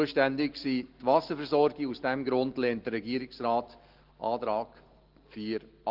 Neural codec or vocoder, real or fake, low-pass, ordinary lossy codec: none; real; 5.4 kHz; Opus, 16 kbps